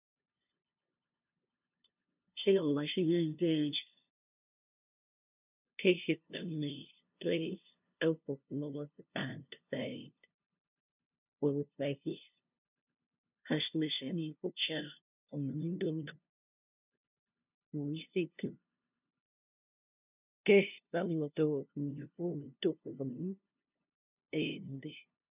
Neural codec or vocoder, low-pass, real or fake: codec, 16 kHz, 0.5 kbps, FunCodec, trained on LibriTTS, 25 frames a second; 3.6 kHz; fake